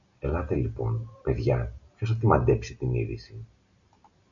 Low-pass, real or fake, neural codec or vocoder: 7.2 kHz; real; none